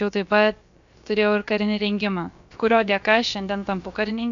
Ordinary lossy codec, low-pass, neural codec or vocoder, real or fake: AAC, 64 kbps; 7.2 kHz; codec, 16 kHz, about 1 kbps, DyCAST, with the encoder's durations; fake